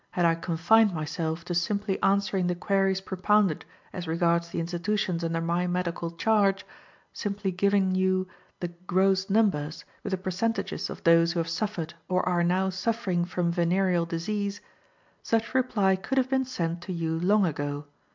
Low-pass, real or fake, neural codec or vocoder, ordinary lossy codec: 7.2 kHz; real; none; MP3, 64 kbps